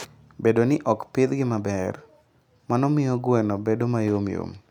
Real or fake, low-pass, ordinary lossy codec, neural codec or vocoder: real; 19.8 kHz; none; none